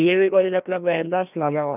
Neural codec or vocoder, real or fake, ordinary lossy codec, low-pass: codec, 16 kHz, 1 kbps, FreqCodec, larger model; fake; none; 3.6 kHz